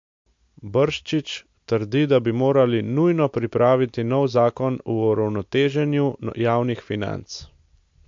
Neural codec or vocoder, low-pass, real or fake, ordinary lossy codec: none; 7.2 kHz; real; MP3, 48 kbps